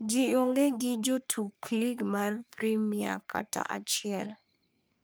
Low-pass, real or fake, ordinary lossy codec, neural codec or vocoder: none; fake; none; codec, 44.1 kHz, 3.4 kbps, Pupu-Codec